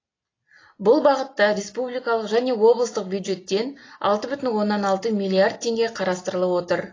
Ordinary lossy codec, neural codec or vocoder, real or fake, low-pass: AAC, 32 kbps; none; real; 7.2 kHz